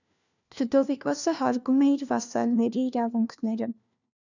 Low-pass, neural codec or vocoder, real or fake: 7.2 kHz; codec, 16 kHz, 1 kbps, FunCodec, trained on LibriTTS, 50 frames a second; fake